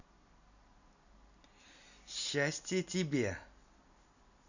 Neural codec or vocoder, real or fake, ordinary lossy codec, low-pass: none; real; none; 7.2 kHz